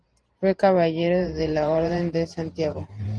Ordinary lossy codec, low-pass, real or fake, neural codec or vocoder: Opus, 24 kbps; 9.9 kHz; fake; vocoder, 44.1 kHz, 128 mel bands every 512 samples, BigVGAN v2